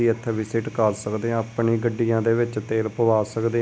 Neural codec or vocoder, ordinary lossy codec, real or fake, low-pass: none; none; real; none